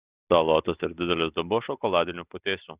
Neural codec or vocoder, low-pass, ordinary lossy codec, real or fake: none; 3.6 kHz; Opus, 64 kbps; real